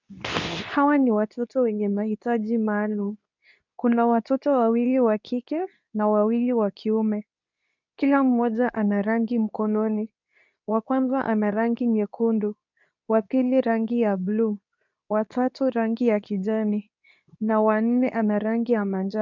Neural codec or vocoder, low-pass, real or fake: codec, 24 kHz, 0.9 kbps, WavTokenizer, medium speech release version 2; 7.2 kHz; fake